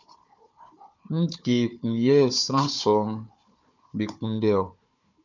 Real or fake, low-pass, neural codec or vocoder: fake; 7.2 kHz; codec, 16 kHz, 4 kbps, FunCodec, trained on Chinese and English, 50 frames a second